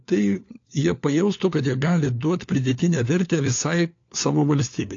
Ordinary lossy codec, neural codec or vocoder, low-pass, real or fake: AAC, 48 kbps; codec, 16 kHz, 4 kbps, FunCodec, trained on LibriTTS, 50 frames a second; 7.2 kHz; fake